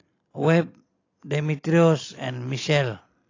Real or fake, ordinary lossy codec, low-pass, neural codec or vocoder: real; AAC, 32 kbps; 7.2 kHz; none